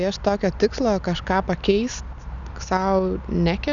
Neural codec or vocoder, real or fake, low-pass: none; real; 7.2 kHz